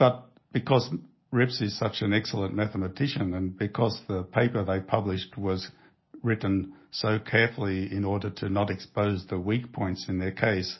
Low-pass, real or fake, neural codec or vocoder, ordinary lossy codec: 7.2 kHz; real; none; MP3, 24 kbps